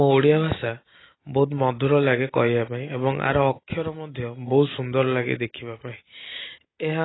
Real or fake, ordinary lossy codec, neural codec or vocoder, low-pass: real; AAC, 16 kbps; none; 7.2 kHz